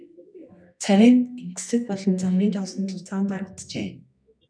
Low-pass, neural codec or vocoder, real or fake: 9.9 kHz; codec, 24 kHz, 0.9 kbps, WavTokenizer, medium music audio release; fake